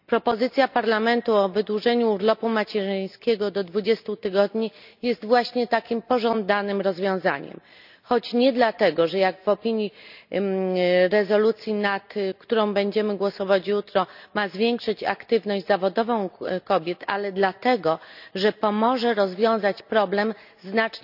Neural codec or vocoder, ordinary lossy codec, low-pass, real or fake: none; none; 5.4 kHz; real